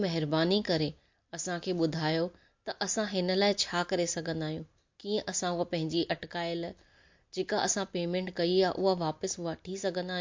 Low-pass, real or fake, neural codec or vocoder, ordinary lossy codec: 7.2 kHz; real; none; MP3, 48 kbps